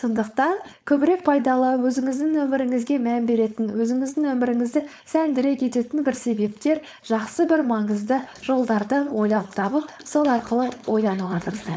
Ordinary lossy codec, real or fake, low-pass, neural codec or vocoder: none; fake; none; codec, 16 kHz, 4.8 kbps, FACodec